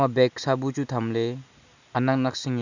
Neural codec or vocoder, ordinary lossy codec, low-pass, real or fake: none; none; 7.2 kHz; real